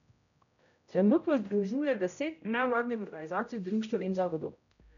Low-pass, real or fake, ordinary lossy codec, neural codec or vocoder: 7.2 kHz; fake; none; codec, 16 kHz, 0.5 kbps, X-Codec, HuBERT features, trained on general audio